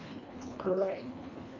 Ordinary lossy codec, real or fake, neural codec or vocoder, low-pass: MP3, 64 kbps; fake; codec, 24 kHz, 1.5 kbps, HILCodec; 7.2 kHz